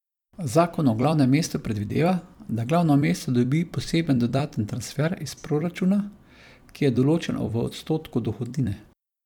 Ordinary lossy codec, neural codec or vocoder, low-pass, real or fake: none; vocoder, 44.1 kHz, 128 mel bands every 256 samples, BigVGAN v2; 19.8 kHz; fake